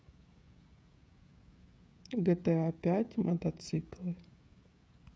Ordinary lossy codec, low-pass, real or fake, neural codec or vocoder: none; none; fake; codec, 16 kHz, 16 kbps, FreqCodec, smaller model